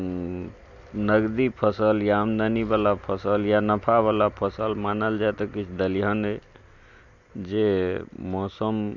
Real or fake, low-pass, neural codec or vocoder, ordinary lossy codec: real; 7.2 kHz; none; none